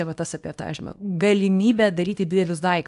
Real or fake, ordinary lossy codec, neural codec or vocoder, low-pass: fake; AAC, 64 kbps; codec, 24 kHz, 0.9 kbps, WavTokenizer, small release; 10.8 kHz